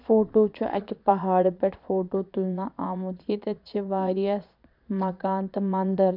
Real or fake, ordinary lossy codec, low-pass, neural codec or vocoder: fake; MP3, 48 kbps; 5.4 kHz; vocoder, 22.05 kHz, 80 mel bands, Vocos